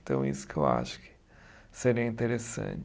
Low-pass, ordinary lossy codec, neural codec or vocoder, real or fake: none; none; none; real